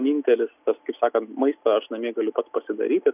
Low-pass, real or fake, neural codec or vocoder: 3.6 kHz; real; none